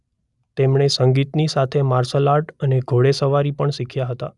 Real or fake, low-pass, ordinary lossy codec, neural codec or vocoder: real; 10.8 kHz; none; none